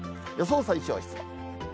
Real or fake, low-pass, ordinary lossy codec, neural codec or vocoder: real; none; none; none